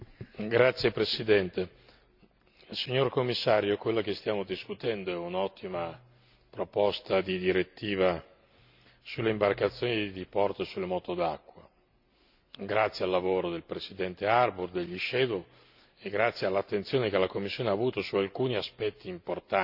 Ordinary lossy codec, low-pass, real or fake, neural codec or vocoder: none; 5.4 kHz; real; none